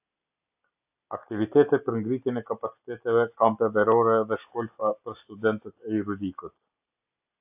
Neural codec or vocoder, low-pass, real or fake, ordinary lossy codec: none; 3.6 kHz; real; AAC, 32 kbps